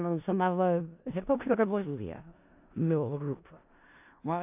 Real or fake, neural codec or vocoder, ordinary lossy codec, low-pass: fake; codec, 16 kHz in and 24 kHz out, 0.4 kbps, LongCat-Audio-Codec, four codebook decoder; AAC, 32 kbps; 3.6 kHz